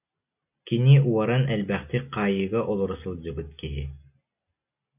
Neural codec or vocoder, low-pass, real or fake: none; 3.6 kHz; real